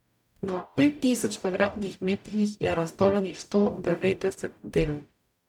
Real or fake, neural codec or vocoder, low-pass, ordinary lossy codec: fake; codec, 44.1 kHz, 0.9 kbps, DAC; 19.8 kHz; none